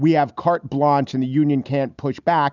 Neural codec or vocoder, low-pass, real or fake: none; 7.2 kHz; real